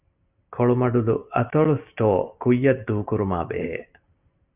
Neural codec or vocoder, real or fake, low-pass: none; real; 3.6 kHz